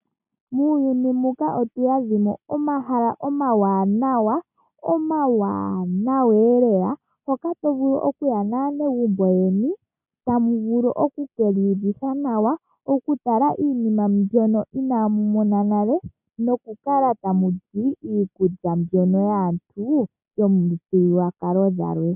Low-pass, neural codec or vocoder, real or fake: 3.6 kHz; none; real